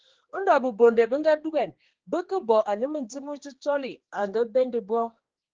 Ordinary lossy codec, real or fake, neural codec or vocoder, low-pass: Opus, 16 kbps; fake; codec, 16 kHz, 2 kbps, X-Codec, HuBERT features, trained on general audio; 7.2 kHz